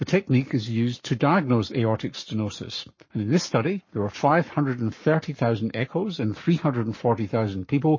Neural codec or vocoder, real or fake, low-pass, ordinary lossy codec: codec, 44.1 kHz, 7.8 kbps, Pupu-Codec; fake; 7.2 kHz; MP3, 32 kbps